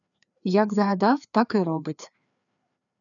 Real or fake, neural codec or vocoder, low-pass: fake; codec, 16 kHz, 2 kbps, FreqCodec, larger model; 7.2 kHz